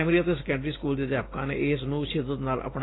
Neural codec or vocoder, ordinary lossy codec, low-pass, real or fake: none; AAC, 16 kbps; 7.2 kHz; real